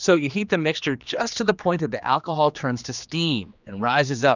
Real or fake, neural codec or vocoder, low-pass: fake; codec, 16 kHz, 2 kbps, X-Codec, HuBERT features, trained on general audio; 7.2 kHz